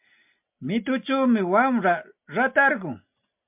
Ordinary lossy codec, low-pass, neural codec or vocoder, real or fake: MP3, 32 kbps; 3.6 kHz; none; real